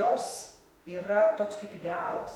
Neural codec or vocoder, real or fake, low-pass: autoencoder, 48 kHz, 32 numbers a frame, DAC-VAE, trained on Japanese speech; fake; 19.8 kHz